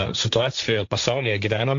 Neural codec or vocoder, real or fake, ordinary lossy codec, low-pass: codec, 16 kHz, 1.1 kbps, Voila-Tokenizer; fake; AAC, 64 kbps; 7.2 kHz